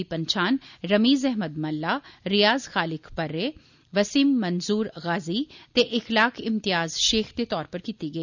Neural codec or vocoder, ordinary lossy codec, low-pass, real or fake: none; none; 7.2 kHz; real